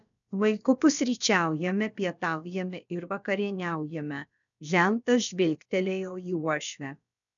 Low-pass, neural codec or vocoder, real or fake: 7.2 kHz; codec, 16 kHz, about 1 kbps, DyCAST, with the encoder's durations; fake